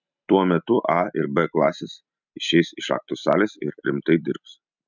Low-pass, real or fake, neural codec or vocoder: 7.2 kHz; real; none